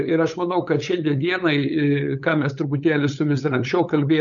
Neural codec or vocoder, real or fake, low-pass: codec, 16 kHz, 8 kbps, FunCodec, trained on LibriTTS, 25 frames a second; fake; 7.2 kHz